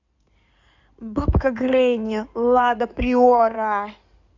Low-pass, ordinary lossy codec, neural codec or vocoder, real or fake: 7.2 kHz; none; codec, 16 kHz in and 24 kHz out, 2.2 kbps, FireRedTTS-2 codec; fake